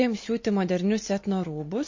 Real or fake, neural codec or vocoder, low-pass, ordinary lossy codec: fake; vocoder, 44.1 kHz, 128 mel bands every 256 samples, BigVGAN v2; 7.2 kHz; MP3, 32 kbps